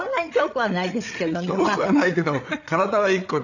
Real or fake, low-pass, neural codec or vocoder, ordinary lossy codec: fake; 7.2 kHz; codec, 16 kHz, 8 kbps, FreqCodec, larger model; none